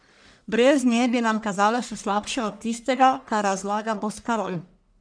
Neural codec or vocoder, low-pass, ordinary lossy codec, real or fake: codec, 44.1 kHz, 1.7 kbps, Pupu-Codec; 9.9 kHz; none; fake